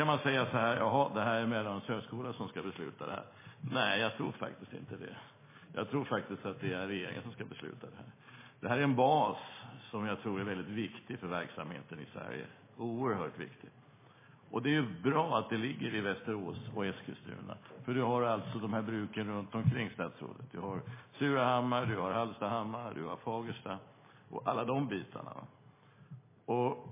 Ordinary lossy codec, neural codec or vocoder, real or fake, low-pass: MP3, 16 kbps; none; real; 3.6 kHz